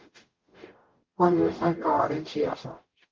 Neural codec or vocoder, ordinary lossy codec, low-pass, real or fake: codec, 44.1 kHz, 0.9 kbps, DAC; Opus, 32 kbps; 7.2 kHz; fake